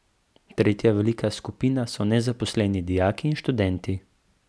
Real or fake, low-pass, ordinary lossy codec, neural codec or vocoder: real; none; none; none